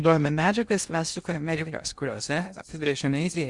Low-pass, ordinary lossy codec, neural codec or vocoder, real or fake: 10.8 kHz; Opus, 64 kbps; codec, 16 kHz in and 24 kHz out, 0.6 kbps, FocalCodec, streaming, 2048 codes; fake